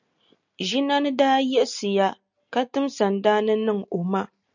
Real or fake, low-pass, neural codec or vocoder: real; 7.2 kHz; none